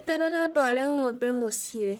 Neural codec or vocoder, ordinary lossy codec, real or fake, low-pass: codec, 44.1 kHz, 1.7 kbps, Pupu-Codec; none; fake; none